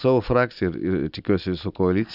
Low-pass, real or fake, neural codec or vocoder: 5.4 kHz; real; none